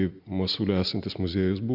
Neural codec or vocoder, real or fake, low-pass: none; real; 5.4 kHz